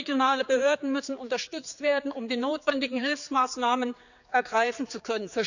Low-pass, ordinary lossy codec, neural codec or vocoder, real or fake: 7.2 kHz; none; codec, 16 kHz, 4 kbps, X-Codec, HuBERT features, trained on general audio; fake